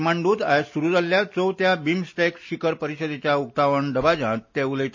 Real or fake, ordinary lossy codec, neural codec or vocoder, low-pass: real; MP3, 32 kbps; none; 7.2 kHz